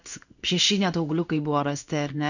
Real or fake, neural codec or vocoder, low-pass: fake; codec, 16 kHz in and 24 kHz out, 1 kbps, XY-Tokenizer; 7.2 kHz